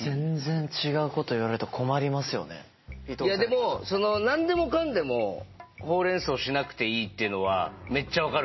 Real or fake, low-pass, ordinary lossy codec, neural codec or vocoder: real; 7.2 kHz; MP3, 24 kbps; none